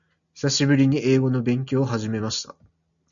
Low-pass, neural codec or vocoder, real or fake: 7.2 kHz; none; real